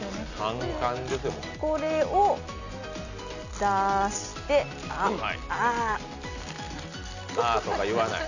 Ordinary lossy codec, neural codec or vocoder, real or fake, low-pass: none; none; real; 7.2 kHz